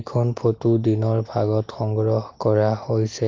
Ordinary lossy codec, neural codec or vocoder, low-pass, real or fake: Opus, 32 kbps; none; 7.2 kHz; real